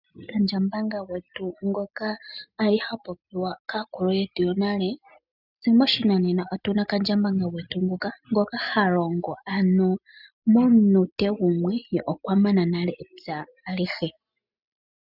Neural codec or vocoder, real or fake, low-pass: none; real; 5.4 kHz